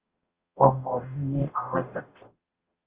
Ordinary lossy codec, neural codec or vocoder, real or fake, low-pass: Opus, 32 kbps; codec, 44.1 kHz, 0.9 kbps, DAC; fake; 3.6 kHz